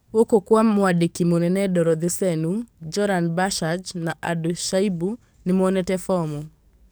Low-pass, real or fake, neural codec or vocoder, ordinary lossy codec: none; fake; codec, 44.1 kHz, 7.8 kbps, DAC; none